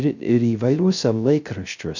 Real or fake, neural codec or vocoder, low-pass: fake; codec, 16 kHz, 0.3 kbps, FocalCodec; 7.2 kHz